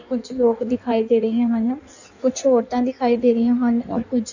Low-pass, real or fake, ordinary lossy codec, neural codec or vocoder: 7.2 kHz; fake; none; codec, 16 kHz in and 24 kHz out, 1.1 kbps, FireRedTTS-2 codec